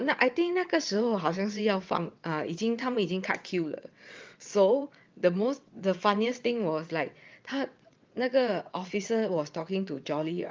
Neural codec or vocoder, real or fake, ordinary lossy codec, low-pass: vocoder, 22.05 kHz, 80 mel bands, WaveNeXt; fake; Opus, 24 kbps; 7.2 kHz